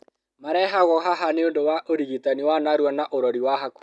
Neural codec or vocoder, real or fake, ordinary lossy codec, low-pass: none; real; none; none